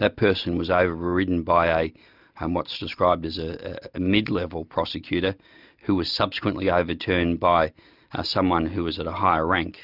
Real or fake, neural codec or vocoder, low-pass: real; none; 5.4 kHz